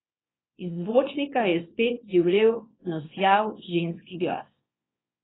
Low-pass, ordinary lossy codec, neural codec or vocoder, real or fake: 7.2 kHz; AAC, 16 kbps; codec, 24 kHz, 0.9 kbps, WavTokenizer, small release; fake